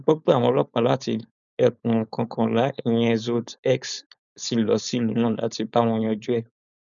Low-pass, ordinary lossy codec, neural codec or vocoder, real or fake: 7.2 kHz; none; codec, 16 kHz, 4.8 kbps, FACodec; fake